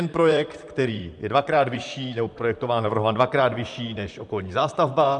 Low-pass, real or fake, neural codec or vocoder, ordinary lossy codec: 10.8 kHz; fake; vocoder, 44.1 kHz, 128 mel bands, Pupu-Vocoder; MP3, 96 kbps